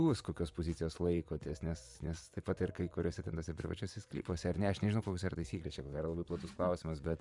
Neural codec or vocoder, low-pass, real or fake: vocoder, 24 kHz, 100 mel bands, Vocos; 10.8 kHz; fake